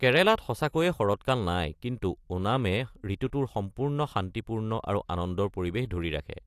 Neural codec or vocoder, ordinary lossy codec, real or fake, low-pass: none; AAC, 64 kbps; real; 14.4 kHz